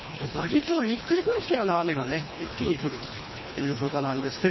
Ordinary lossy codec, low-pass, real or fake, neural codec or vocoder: MP3, 24 kbps; 7.2 kHz; fake; codec, 24 kHz, 1.5 kbps, HILCodec